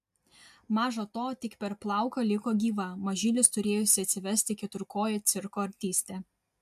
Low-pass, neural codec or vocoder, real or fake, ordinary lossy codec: 14.4 kHz; none; real; AAC, 96 kbps